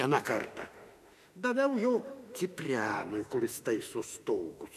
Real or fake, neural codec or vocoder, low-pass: fake; autoencoder, 48 kHz, 32 numbers a frame, DAC-VAE, trained on Japanese speech; 14.4 kHz